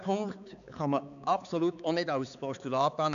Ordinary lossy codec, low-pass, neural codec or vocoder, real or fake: none; 7.2 kHz; codec, 16 kHz, 4 kbps, X-Codec, HuBERT features, trained on balanced general audio; fake